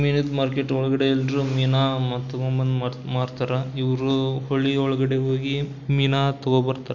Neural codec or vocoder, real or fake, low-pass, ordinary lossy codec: none; real; 7.2 kHz; none